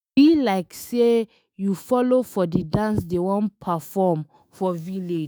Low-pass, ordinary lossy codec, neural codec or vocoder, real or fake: none; none; autoencoder, 48 kHz, 128 numbers a frame, DAC-VAE, trained on Japanese speech; fake